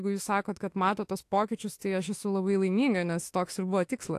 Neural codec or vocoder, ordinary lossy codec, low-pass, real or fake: autoencoder, 48 kHz, 32 numbers a frame, DAC-VAE, trained on Japanese speech; AAC, 64 kbps; 14.4 kHz; fake